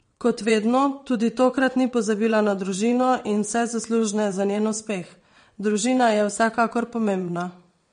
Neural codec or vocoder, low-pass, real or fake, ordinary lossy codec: vocoder, 22.05 kHz, 80 mel bands, WaveNeXt; 9.9 kHz; fake; MP3, 48 kbps